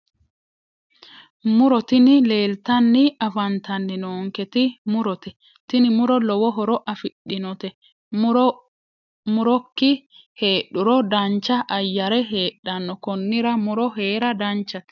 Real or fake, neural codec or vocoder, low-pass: real; none; 7.2 kHz